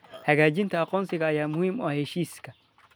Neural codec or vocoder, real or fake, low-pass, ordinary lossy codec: none; real; none; none